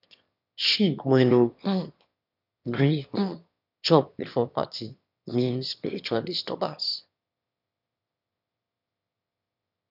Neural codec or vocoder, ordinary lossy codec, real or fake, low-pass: autoencoder, 22.05 kHz, a latent of 192 numbers a frame, VITS, trained on one speaker; none; fake; 5.4 kHz